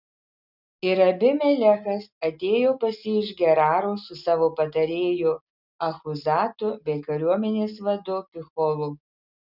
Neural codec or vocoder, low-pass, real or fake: none; 5.4 kHz; real